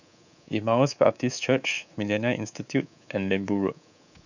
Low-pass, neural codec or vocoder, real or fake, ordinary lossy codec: 7.2 kHz; codec, 24 kHz, 3.1 kbps, DualCodec; fake; none